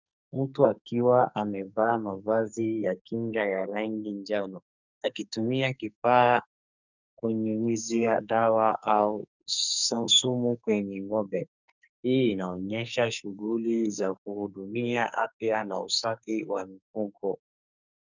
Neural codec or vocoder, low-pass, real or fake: codec, 44.1 kHz, 2.6 kbps, SNAC; 7.2 kHz; fake